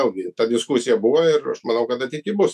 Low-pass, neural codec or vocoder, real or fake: 14.4 kHz; autoencoder, 48 kHz, 128 numbers a frame, DAC-VAE, trained on Japanese speech; fake